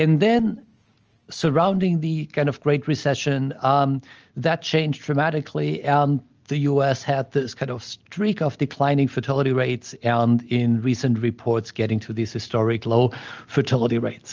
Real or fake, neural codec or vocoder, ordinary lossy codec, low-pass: real; none; Opus, 24 kbps; 7.2 kHz